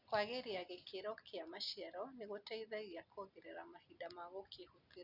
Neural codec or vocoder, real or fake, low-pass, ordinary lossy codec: none; real; 5.4 kHz; none